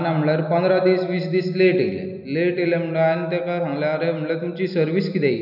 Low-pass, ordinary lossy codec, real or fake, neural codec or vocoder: 5.4 kHz; none; real; none